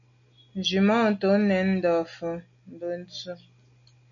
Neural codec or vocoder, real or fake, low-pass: none; real; 7.2 kHz